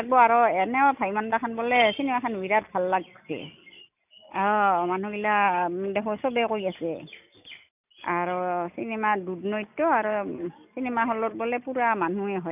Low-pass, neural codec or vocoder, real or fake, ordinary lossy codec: 3.6 kHz; none; real; none